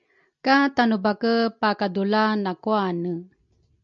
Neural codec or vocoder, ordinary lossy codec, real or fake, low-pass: none; MP3, 64 kbps; real; 7.2 kHz